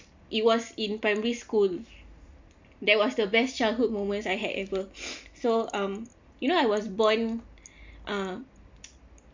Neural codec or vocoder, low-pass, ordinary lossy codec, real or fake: none; 7.2 kHz; MP3, 64 kbps; real